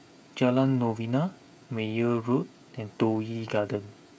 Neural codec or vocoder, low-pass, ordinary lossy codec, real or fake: codec, 16 kHz, 16 kbps, FreqCodec, smaller model; none; none; fake